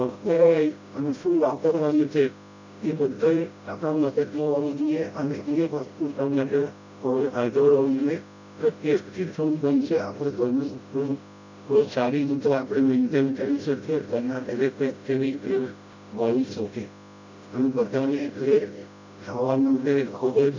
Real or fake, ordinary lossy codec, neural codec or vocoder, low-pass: fake; MP3, 64 kbps; codec, 16 kHz, 0.5 kbps, FreqCodec, smaller model; 7.2 kHz